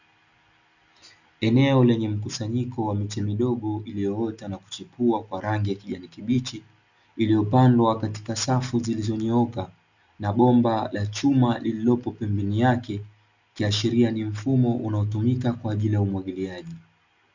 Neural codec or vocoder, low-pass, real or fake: none; 7.2 kHz; real